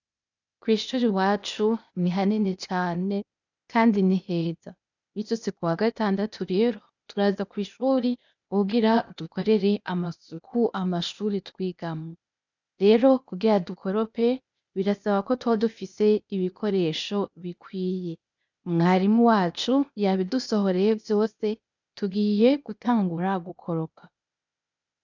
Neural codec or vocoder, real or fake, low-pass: codec, 16 kHz, 0.8 kbps, ZipCodec; fake; 7.2 kHz